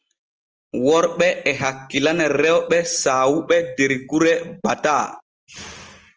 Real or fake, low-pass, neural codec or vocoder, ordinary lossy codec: real; 7.2 kHz; none; Opus, 24 kbps